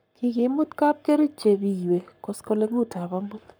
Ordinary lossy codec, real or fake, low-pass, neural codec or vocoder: none; fake; none; codec, 44.1 kHz, 7.8 kbps, Pupu-Codec